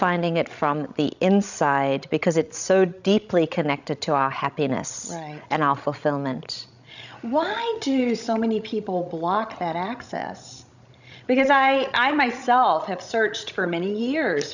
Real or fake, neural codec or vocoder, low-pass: fake; codec, 16 kHz, 16 kbps, FreqCodec, larger model; 7.2 kHz